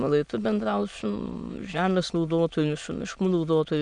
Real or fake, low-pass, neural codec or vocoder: fake; 9.9 kHz; autoencoder, 22.05 kHz, a latent of 192 numbers a frame, VITS, trained on many speakers